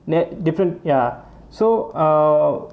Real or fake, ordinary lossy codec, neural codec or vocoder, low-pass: real; none; none; none